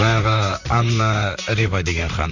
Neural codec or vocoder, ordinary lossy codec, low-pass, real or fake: vocoder, 44.1 kHz, 128 mel bands, Pupu-Vocoder; none; 7.2 kHz; fake